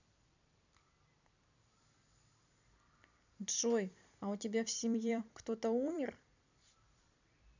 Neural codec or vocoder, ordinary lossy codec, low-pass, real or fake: vocoder, 44.1 kHz, 128 mel bands every 256 samples, BigVGAN v2; none; 7.2 kHz; fake